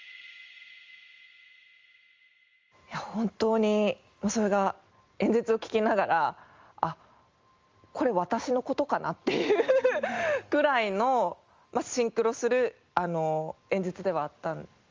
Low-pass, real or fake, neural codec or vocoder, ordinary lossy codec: 7.2 kHz; real; none; Opus, 32 kbps